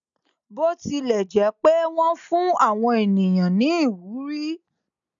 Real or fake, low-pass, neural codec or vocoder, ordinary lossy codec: real; 7.2 kHz; none; none